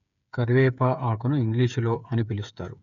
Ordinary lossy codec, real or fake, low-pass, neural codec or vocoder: none; fake; 7.2 kHz; codec, 16 kHz, 8 kbps, FreqCodec, smaller model